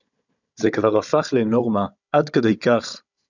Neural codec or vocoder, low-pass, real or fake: codec, 16 kHz, 16 kbps, FunCodec, trained on Chinese and English, 50 frames a second; 7.2 kHz; fake